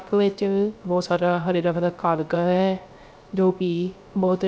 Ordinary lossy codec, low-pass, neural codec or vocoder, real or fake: none; none; codec, 16 kHz, 0.3 kbps, FocalCodec; fake